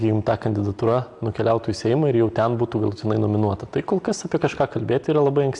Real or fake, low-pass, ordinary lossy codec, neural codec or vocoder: real; 10.8 kHz; AAC, 64 kbps; none